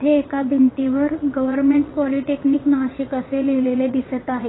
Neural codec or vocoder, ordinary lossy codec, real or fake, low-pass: vocoder, 22.05 kHz, 80 mel bands, WaveNeXt; AAC, 16 kbps; fake; 7.2 kHz